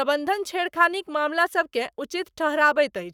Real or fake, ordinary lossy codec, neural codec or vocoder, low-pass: fake; none; codec, 44.1 kHz, 7.8 kbps, Pupu-Codec; 19.8 kHz